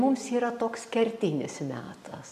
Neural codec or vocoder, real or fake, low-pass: none; real; 14.4 kHz